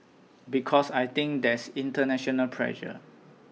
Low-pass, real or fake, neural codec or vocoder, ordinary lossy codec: none; real; none; none